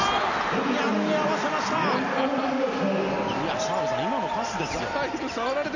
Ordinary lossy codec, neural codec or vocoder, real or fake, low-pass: none; none; real; 7.2 kHz